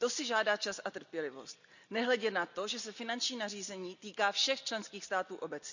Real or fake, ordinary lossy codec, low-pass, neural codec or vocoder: real; none; 7.2 kHz; none